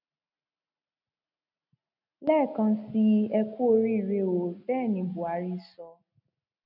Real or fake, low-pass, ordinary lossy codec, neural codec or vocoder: real; 5.4 kHz; none; none